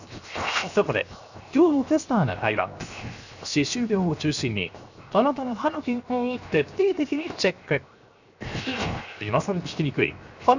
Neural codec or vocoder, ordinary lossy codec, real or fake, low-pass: codec, 16 kHz, 0.7 kbps, FocalCodec; none; fake; 7.2 kHz